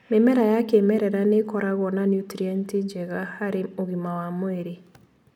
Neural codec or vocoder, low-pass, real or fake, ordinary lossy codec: none; 19.8 kHz; real; none